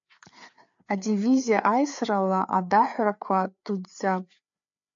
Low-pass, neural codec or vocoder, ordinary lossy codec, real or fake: 7.2 kHz; codec, 16 kHz, 4 kbps, FreqCodec, larger model; AAC, 64 kbps; fake